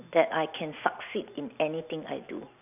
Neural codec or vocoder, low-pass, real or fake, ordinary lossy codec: none; 3.6 kHz; real; none